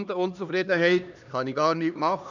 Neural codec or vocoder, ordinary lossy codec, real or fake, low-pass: codec, 16 kHz, 2 kbps, X-Codec, HuBERT features, trained on LibriSpeech; none; fake; 7.2 kHz